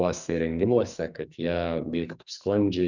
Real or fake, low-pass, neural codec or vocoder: fake; 7.2 kHz; codec, 44.1 kHz, 2.6 kbps, SNAC